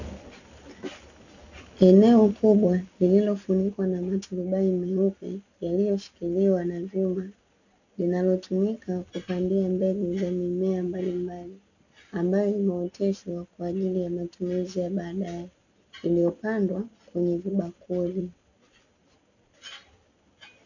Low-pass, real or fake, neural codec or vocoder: 7.2 kHz; real; none